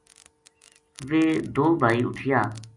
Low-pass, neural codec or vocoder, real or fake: 10.8 kHz; none; real